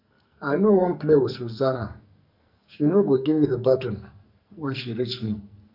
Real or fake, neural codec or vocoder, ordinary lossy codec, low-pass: fake; codec, 44.1 kHz, 2.6 kbps, SNAC; none; 5.4 kHz